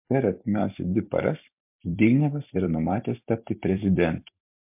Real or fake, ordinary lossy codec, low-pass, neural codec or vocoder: fake; MP3, 32 kbps; 3.6 kHz; vocoder, 44.1 kHz, 128 mel bands every 256 samples, BigVGAN v2